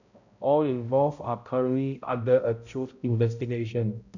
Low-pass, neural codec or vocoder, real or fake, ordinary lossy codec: 7.2 kHz; codec, 16 kHz, 0.5 kbps, X-Codec, HuBERT features, trained on balanced general audio; fake; none